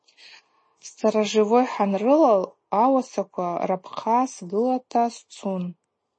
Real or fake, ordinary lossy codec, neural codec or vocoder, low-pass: real; MP3, 32 kbps; none; 9.9 kHz